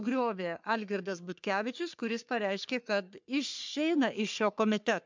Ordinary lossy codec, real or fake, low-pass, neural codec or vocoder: MP3, 64 kbps; fake; 7.2 kHz; codec, 44.1 kHz, 3.4 kbps, Pupu-Codec